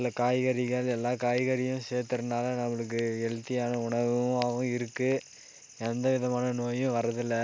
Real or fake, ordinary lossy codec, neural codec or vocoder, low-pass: real; none; none; none